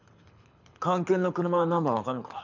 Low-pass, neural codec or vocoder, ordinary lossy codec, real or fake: 7.2 kHz; codec, 24 kHz, 6 kbps, HILCodec; none; fake